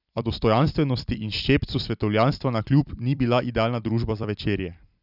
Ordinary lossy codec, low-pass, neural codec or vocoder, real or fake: none; 5.4 kHz; vocoder, 44.1 kHz, 128 mel bands every 256 samples, BigVGAN v2; fake